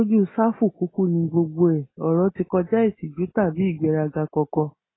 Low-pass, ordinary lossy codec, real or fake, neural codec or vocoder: 7.2 kHz; AAC, 16 kbps; real; none